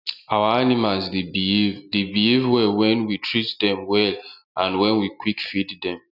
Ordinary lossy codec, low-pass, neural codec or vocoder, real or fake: none; 5.4 kHz; none; real